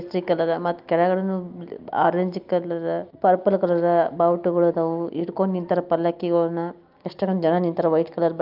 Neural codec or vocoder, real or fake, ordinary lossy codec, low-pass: none; real; Opus, 24 kbps; 5.4 kHz